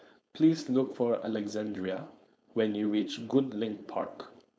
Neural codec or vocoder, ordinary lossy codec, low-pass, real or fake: codec, 16 kHz, 4.8 kbps, FACodec; none; none; fake